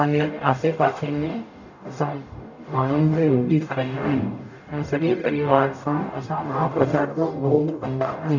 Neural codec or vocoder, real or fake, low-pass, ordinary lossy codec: codec, 44.1 kHz, 0.9 kbps, DAC; fake; 7.2 kHz; none